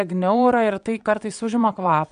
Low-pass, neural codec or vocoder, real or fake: 9.9 kHz; vocoder, 22.05 kHz, 80 mel bands, Vocos; fake